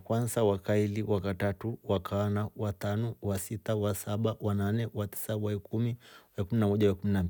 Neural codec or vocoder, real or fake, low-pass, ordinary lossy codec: none; real; none; none